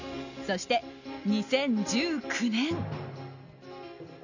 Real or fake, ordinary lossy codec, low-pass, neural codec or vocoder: real; none; 7.2 kHz; none